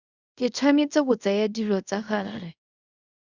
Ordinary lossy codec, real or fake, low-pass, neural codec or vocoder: Opus, 64 kbps; fake; 7.2 kHz; codec, 24 kHz, 0.5 kbps, DualCodec